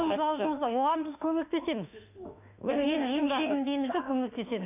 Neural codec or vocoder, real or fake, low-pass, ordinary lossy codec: autoencoder, 48 kHz, 32 numbers a frame, DAC-VAE, trained on Japanese speech; fake; 3.6 kHz; none